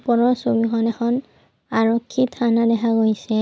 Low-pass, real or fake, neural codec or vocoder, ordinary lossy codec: none; real; none; none